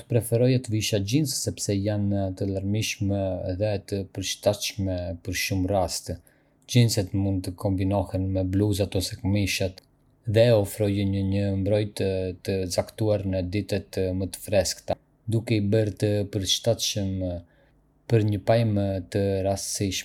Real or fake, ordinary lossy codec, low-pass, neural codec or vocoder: real; none; 19.8 kHz; none